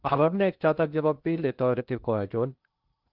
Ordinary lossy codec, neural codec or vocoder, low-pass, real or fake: Opus, 32 kbps; codec, 16 kHz in and 24 kHz out, 0.6 kbps, FocalCodec, streaming, 2048 codes; 5.4 kHz; fake